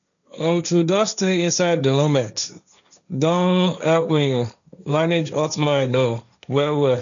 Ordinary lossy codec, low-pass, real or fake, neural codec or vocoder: none; 7.2 kHz; fake; codec, 16 kHz, 1.1 kbps, Voila-Tokenizer